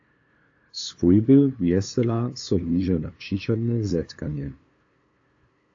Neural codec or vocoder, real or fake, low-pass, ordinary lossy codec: codec, 16 kHz, 2 kbps, FunCodec, trained on LibriTTS, 25 frames a second; fake; 7.2 kHz; AAC, 48 kbps